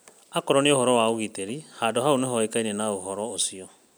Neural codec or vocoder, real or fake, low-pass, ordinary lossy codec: none; real; none; none